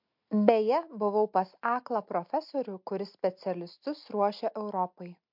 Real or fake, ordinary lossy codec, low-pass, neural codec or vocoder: real; MP3, 48 kbps; 5.4 kHz; none